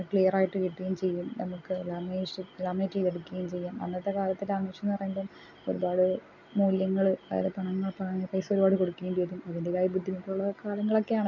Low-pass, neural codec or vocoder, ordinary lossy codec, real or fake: 7.2 kHz; none; none; real